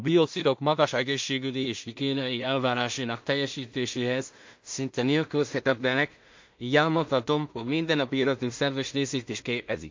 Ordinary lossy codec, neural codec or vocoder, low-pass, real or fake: MP3, 48 kbps; codec, 16 kHz in and 24 kHz out, 0.4 kbps, LongCat-Audio-Codec, two codebook decoder; 7.2 kHz; fake